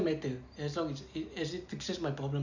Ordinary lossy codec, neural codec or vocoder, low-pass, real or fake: none; none; 7.2 kHz; real